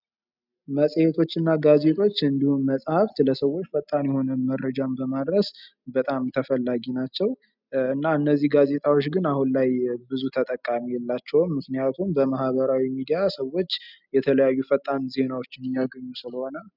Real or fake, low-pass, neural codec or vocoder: real; 5.4 kHz; none